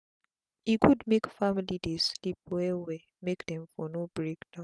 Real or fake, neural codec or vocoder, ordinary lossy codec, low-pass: real; none; none; none